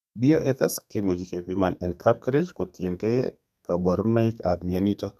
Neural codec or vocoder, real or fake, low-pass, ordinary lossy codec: codec, 32 kHz, 1.9 kbps, SNAC; fake; 14.4 kHz; none